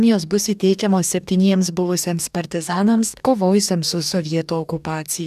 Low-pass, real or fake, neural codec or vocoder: 14.4 kHz; fake; codec, 44.1 kHz, 2.6 kbps, DAC